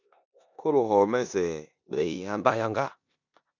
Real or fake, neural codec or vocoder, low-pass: fake; codec, 16 kHz in and 24 kHz out, 0.9 kbps, LongCat-Audio-Codec, four codebook decoder; 7.2 kHz